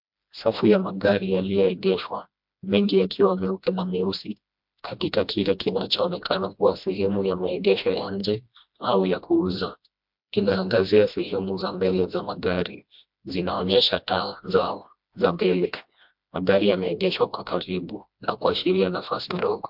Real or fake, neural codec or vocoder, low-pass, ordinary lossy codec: fake; codec, 16 kHz, 1 kbps, FreqCodec, smaller model; 5.4 kHz; MP3, 48 kbps